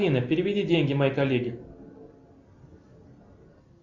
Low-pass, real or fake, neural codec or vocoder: 7.2 kHz; real; none